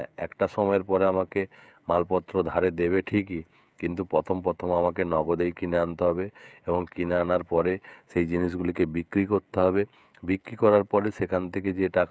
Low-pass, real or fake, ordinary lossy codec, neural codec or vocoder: none; fake; none; codec, 16 kHz, 16 kbps, FreqCodec, smaller model